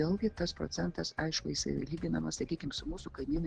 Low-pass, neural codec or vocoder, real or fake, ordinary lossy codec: 9.9 kHz; none; real; Opus, 16 kbps